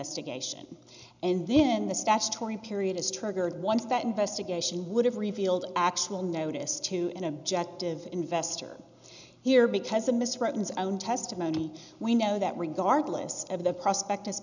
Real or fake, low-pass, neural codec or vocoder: real; 7.2 kHz; none